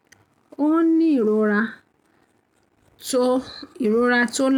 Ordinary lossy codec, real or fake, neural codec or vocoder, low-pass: none; real; none; 19.8 kHz